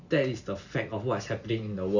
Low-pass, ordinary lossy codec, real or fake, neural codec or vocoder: 7.2 kHz; none; real; none